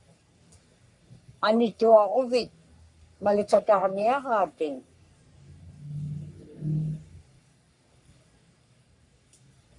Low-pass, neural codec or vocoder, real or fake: 10.8 kHz; codec, 44.1 kHz, 3.4 kbps, Pupu-Codec; fake